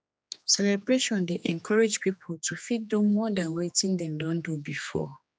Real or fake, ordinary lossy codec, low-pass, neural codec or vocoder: fake; none; none; codec, 16 kHz, 2 kbps, X-Codec, HuBERT features, trained on general audio